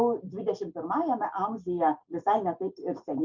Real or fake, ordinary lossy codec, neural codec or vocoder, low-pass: real; MP3, 64 kbps; none; 7.2 kHz